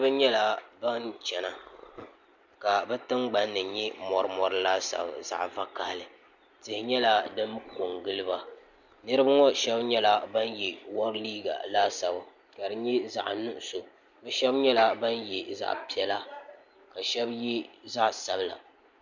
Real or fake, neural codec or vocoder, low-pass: real; none; 7.2 kHz